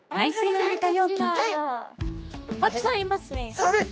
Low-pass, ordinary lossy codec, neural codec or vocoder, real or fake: none; none; codec, 16 kHz, 2 kbps, X-Codec, HuBERT features, trained on general audio; fake